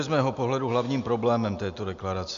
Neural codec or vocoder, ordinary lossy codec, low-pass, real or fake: none; AAC, 96 kbps; 7.2 kHz; real